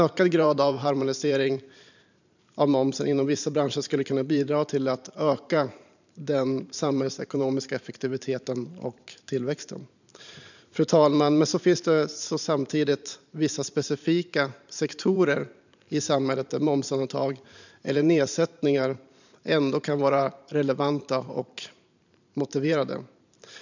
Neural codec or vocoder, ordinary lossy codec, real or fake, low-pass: vocoder, 44.1 kHz, 128 mel bands every 512 samples, BigVGAN v2; none; fake; 7.2 kHz